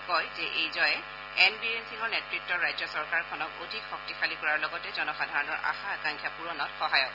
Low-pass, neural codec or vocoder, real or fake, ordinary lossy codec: 5.4 kHz; none; real; none